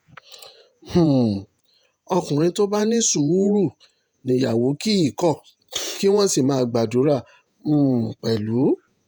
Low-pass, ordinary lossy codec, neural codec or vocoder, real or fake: none; none; vocoder, 48 kHz, 128 mel bands, Vocos; fake